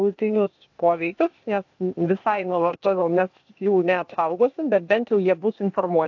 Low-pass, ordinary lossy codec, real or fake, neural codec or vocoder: 7.2 kHz; AAC, 48 kbps; fake; codec, 16 kHz, 0.8 kbps, ZipCodec